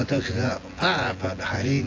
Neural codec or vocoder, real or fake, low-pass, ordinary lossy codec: vocoder, 24 kHz, 100 mel bands, Vocos; fake; 7.2 kHz; AAC, 32 kbps